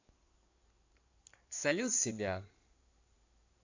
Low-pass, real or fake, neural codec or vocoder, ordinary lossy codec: 7.2 kHz; fake; codec, 16 kHz in and 24 kHz out, 2.2 kbps, FireRedTTS-2 codec; AAC, 48 kbps